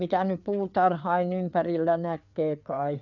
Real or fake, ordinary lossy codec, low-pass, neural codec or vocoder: fake; none; 7.2 kHz; codec, 16 kHz, 4 kbps, FreqCodec, larger model